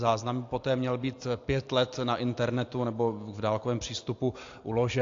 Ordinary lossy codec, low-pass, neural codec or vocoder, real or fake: AAC, 48 kbps; 7.2 kHz; none; real